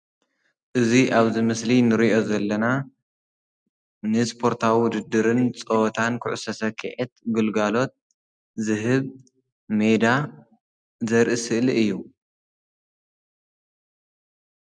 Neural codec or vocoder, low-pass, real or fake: none; 9.9 kHz; real